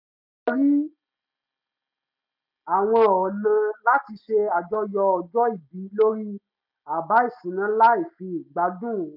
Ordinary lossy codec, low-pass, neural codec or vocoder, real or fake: none; 5.4 kHz; none; real